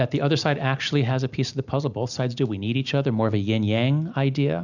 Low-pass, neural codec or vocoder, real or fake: 7.2 kHz; none; real